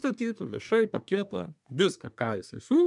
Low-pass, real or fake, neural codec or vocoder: 10.8 kHz; fake; codec, 24 kHz, 1 kbps, SNAC